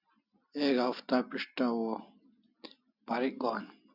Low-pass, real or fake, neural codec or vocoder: 5.4 kHz; real; none